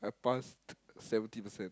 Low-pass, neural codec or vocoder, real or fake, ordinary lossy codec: none; none; real; none